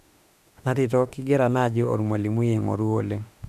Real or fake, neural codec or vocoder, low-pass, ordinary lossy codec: fake; autoencoder, 48 kHz, 32 numbers a frame, DAC-VAE, trained on Japanese speech; 14.4 kHz; none